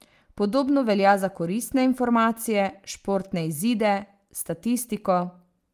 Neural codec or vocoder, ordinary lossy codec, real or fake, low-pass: none; Opus, 32 kbps; real; 14.4 kHz